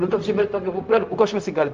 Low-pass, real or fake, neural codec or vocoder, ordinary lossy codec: 7.2 kHz; fake; codec, 16 kHz, 0.4 kbps, LongCat-Audio-Codec; Opus, 32 kbps